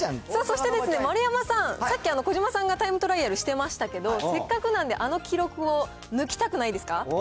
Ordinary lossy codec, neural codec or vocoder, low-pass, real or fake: none; none; none; real